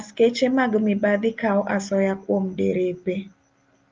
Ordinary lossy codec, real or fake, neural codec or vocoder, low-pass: Opus, 24 kbps; real; none; 7.2 kHz